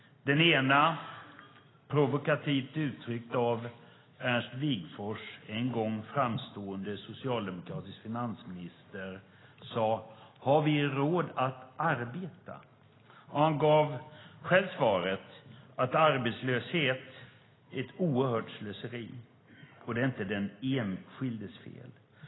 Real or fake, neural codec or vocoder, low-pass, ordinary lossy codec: real; none; 7.2 kHz; AAC, 16 kbps